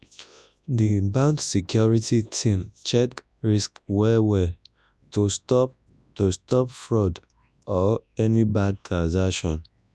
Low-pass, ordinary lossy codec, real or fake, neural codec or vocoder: none; none; fake; codec, 24 kHz, 0.9 kbps, WavTokenizer, large speech release